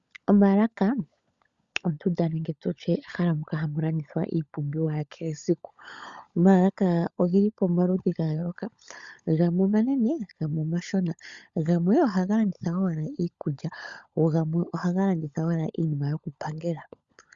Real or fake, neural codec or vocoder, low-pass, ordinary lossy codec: fake; codec, 16 kHz, 16 kbps, FunCodec, trained on LibriTTS, 50 frames a second; 7.2 kHz; Opus, 64 kbps